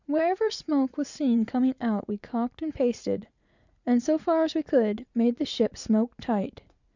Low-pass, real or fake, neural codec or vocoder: 7.2 kHz; fake; vocoder, 44.1 kHz, 80 mel bands, Vocos